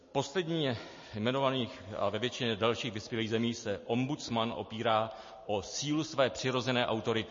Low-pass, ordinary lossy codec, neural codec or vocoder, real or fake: 7.2 kHz; MP3, 32 kbps; none; real